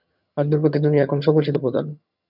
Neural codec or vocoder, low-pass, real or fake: vocoder, 22.05 kHz, 80 mel bands, HiFi-GAN; 5.4 kHz; fake